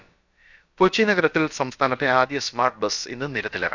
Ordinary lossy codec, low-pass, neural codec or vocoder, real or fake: none; 7.2 kHz; codec, 16 kHz, about 1 kbps, DyCAST, with the encoder's durations; fake